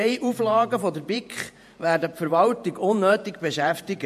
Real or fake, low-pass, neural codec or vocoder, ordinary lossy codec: fake; 14.4 kHz; vocoder, 48 kHz, 128 mel bands, Vocos; MP3, 64 kbps